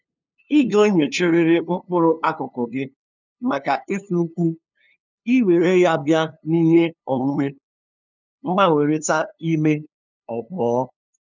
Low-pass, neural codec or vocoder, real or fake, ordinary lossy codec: 7.2 kHz; codec, 16 kHz, 2 kbps, FunCodec, trained on LibriTTS, 25 frames a second; fake; none